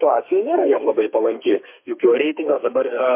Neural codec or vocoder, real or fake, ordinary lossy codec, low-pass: codec, 32 kHz, 1.9 kbps, SNAC; fake; MP3, 24 kbps; 3.6 kHz